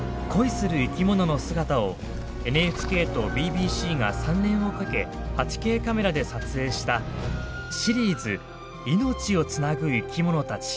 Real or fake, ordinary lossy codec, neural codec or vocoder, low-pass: real; none; none; none